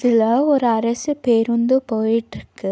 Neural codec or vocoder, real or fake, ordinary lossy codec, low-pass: none; real; none; none